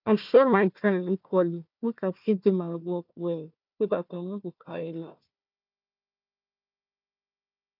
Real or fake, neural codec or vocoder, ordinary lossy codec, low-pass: fake; codec, 16 kHz, 1 kbps, FunCodec, trained on Chinese and English, 50 frames a second; none; 5.4 kHz